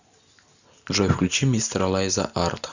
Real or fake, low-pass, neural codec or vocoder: real; 7.2 kHz; none